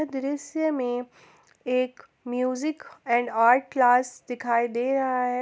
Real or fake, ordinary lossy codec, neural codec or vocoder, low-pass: real; none; none; none